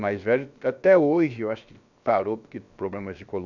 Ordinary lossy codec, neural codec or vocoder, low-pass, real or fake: none; codec, 16 kHz, about 1 kbps, DyCAST, with the encoder's durations; 7.2 kHz; fake